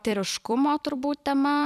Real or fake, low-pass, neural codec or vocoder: fake; 14.4 kHz; vocoder, 44.1 kHz, 128 mel bands every 256 samples, BigVGAN v2